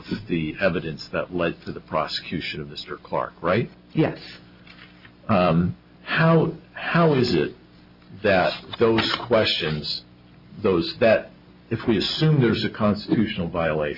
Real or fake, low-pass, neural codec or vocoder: real; 5.4 kHz; none